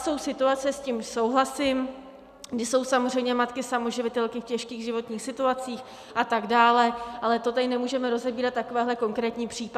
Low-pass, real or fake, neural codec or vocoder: 14.4 kHz; real; none